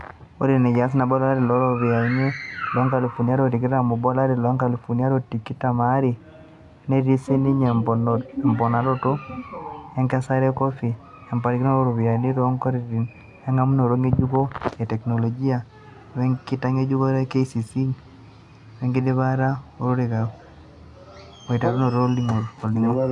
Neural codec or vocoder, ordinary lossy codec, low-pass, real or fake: none; AAC, 64 kbps; 10.8 kHz; real